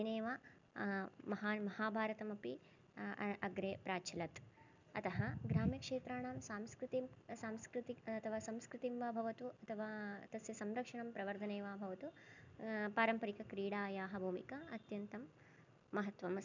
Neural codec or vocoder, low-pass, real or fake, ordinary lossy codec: none; 7.2 kHz; real; none